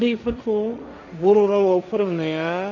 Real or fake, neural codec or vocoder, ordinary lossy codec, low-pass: fake; codec, 16 kHz, 1.1 kbps, Voila-Tokenizer; none; 7.2 kHz